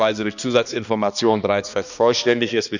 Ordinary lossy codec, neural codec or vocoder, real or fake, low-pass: none; codec, 16 kHz, 2 kbps, X-Codec, HuBERT features, trained on balanced general audio; fake; 7.2 kHz